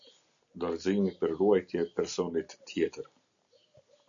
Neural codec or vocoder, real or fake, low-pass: none; real; 7.2 kHz